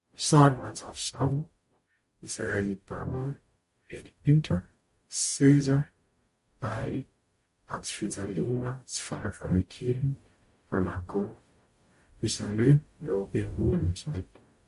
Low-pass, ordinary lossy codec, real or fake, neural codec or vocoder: 14.4 kHz; MP3, 48 kbps; fake; codec, 44.1 kHz, 0.9 kbps, DAC